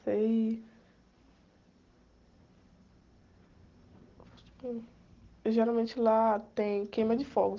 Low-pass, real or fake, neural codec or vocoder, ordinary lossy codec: 7.2 kHz; real; none; Opus, 16 kbps